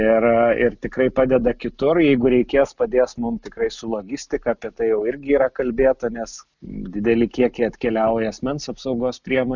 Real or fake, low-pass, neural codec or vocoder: real; 7.2 kHz; none